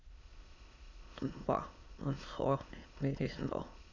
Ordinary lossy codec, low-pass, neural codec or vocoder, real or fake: Opus, 64 kbps; 7.2 kHz; autoencoder, 22.05 kHz, a latent of 192 numbers a frame, VITS, trained on many speakers; fake